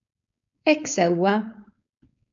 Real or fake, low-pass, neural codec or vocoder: fake; 7.2 kHz; codec, 16 kHz, 4.8 kbps, FACodec